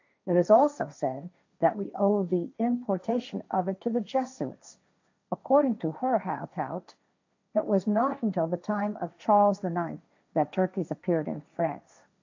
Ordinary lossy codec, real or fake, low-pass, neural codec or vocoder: AAC, 48 kbps; fake; 7.2 kHz; codec, 16 kHz, 1.1 kbps, Voila-Tokenizer